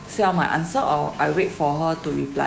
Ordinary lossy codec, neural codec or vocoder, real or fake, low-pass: none; codec, 16 kHz, 6 kbps, DAC; fake; none